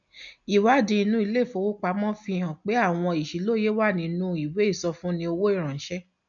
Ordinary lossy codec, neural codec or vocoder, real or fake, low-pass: MP3, 96 kbps; none; real; 7.2 kHz